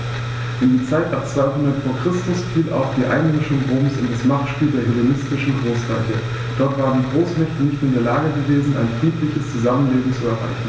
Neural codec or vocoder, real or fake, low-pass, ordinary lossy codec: none; real; none; none